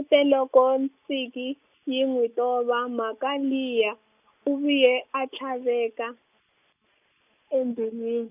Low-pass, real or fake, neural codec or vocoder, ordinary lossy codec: 3.6 kHz; real; none; none